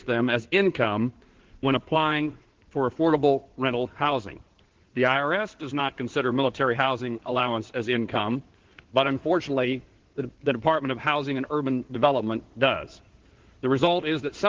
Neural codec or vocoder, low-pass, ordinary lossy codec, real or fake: codec, 16 kHz in and 24 kHz out, 2.2 kbps, FireRedTTS-2 codec; 7.2 kHz; Opus, 16 kbps; fake